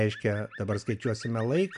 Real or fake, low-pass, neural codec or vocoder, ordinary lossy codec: real; 10.8 kHz; none; MP3, 96 kbps